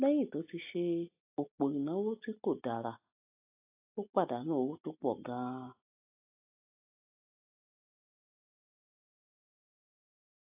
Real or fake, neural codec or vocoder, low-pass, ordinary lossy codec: real; none; 3.6 kHz; AAC, 32 kbps